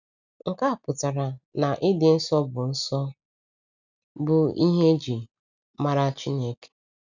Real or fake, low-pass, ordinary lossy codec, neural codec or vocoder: real; 7.2 kHz; none; none